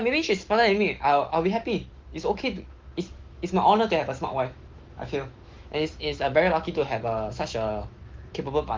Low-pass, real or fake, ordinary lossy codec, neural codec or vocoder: 7.2 kHz; fake; Opus, 16 kbps; autoencoder, 48 kHz, 128 numbers a frame, DAC-VAE, trained on Japanese speech